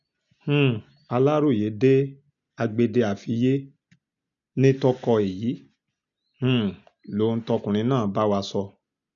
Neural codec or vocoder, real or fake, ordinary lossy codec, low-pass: none; real; none; 7.2 kHz